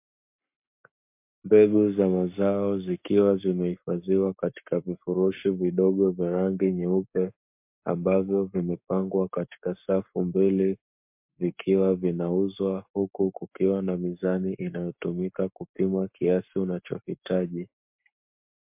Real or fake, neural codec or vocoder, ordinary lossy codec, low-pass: fake; codec, 44.1 kHz, 7.8 kbps, Pupu-Codec; MP3, 32 kbps; 3.6 kHz